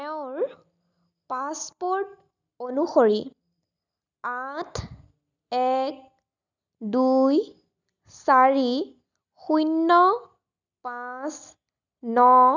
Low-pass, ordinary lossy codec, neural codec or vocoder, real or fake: 7.2 kHz; none; none; real